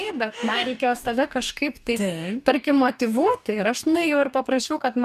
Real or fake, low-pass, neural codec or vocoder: fake; 14.4 kHz; codec, 44.1 kHz, 2.6 kbps, DAC